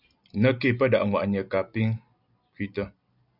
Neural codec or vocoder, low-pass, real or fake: none; 5.4 kHz; real